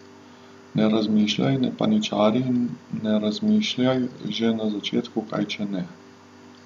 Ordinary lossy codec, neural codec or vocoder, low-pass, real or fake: none; none; 14.4 kHz; real